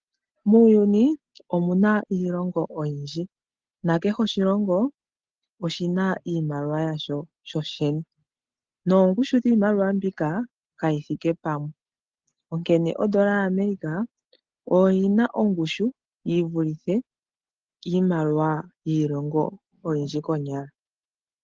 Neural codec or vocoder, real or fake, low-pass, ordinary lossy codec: none; real; 7.2 kHz; Opus, 16 kbps